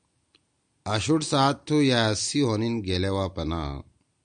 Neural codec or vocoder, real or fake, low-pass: none; real; 9.9 kHz